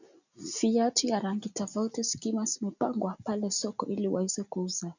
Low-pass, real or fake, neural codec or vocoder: 7.2 kHz; real; none